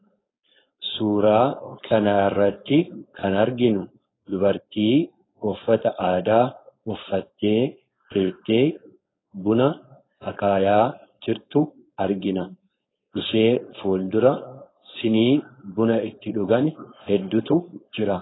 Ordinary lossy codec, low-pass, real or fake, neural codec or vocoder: AAC, 16 kbps; 7.2 kHz; fake; codec, 16 kHz, 4.8 kbps, FACodec